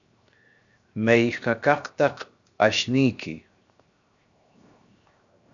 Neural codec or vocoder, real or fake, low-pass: codec, 16 kHz, 0.7 kbps, FocalCodec; fake; 7.2 kHz